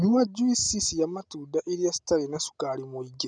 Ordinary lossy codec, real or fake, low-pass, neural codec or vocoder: none; real; 9.9 kHz; none